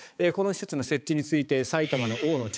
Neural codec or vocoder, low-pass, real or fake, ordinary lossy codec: codec, 16 kHz, 4 kbps, X-Codec, HuBERT features, trained on balanced general audio; none; fake; none